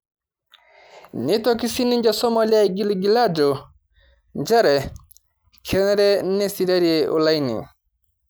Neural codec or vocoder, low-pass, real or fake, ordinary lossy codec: none; none; real; none